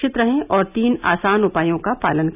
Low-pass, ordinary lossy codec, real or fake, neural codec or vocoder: 3.6 kHz; none; real; none